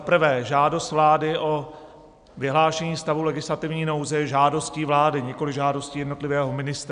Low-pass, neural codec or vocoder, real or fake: 9.9 kHz; none; real